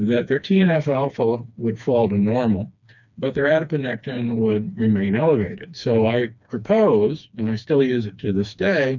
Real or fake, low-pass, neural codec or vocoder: fake; 7.2 kHz; codec, 16 kHz, 2 kbps, FreqCodec, smaller model